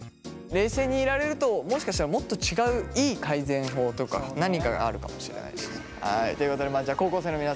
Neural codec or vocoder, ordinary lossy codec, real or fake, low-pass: none; none; real; none